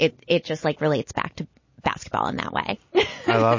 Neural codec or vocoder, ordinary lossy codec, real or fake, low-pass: none; MP3, 32 kbps; real; 7.2 kHz